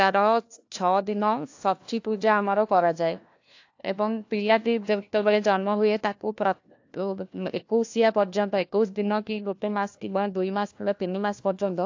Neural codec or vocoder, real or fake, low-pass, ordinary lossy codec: codec, 16 kHz, 1 kbps, FunCodec, trained on LibriTTS, 50 frames a second; fake; 7.2 kHz; AAC, 48 kbps